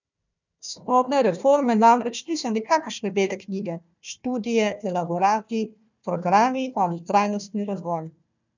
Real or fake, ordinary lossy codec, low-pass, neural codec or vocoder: fake; none; 7.2 kHz; codec, 16 kHz, 1 kbps, FunCodec, trained on Chinese and English, 50 frames a second